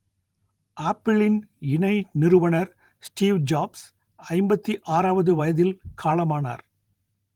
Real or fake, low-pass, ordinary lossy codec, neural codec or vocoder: fake; 19.8 kHz; Opus, 24 kbps; vocoder, 44.1 kHz, 128 mel bands every 512 samples, BigVGAN v2